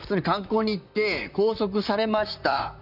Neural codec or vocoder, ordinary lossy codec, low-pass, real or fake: vocoder, 44.1 kHz, 128 mel bands, Pupu-Vocoder; none; 5.4 kHz; fake